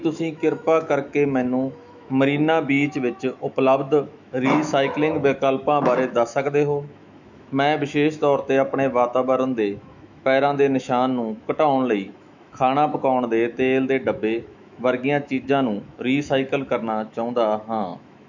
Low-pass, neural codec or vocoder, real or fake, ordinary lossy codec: 7.2 kHz; autoencoder, 48 kHz, 128 numbers a frame, DAC-VAE, trained on Japanese speech; fake; none